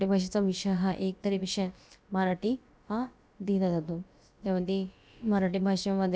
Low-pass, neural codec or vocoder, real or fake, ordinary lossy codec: none; codec, 16 kHz, about 1 kbps, DyCAST, with the encoder's durations; fake; none